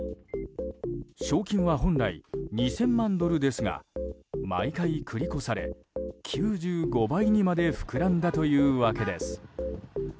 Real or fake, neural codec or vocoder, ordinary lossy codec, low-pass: real; none; none; none